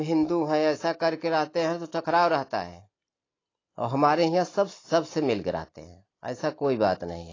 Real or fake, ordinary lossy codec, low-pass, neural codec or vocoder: real; AAC, 32 kbps; 7.2 kHz; none